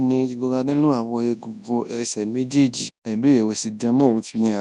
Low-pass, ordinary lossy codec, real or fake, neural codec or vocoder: 10.8 kHz; none; fake; codec, 24 kHz, 0.9 kbps, WavTokenizer, large speech release